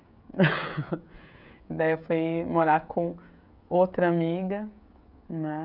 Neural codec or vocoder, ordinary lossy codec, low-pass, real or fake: codec, 16 kHz, 16 kbps, FreqCodec, smaller model; none; 5.4 kHz; fake